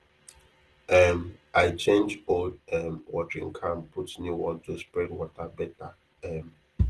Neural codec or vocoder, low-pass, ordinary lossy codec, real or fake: none; 10.8 kHz; Opus, 16 kbps; real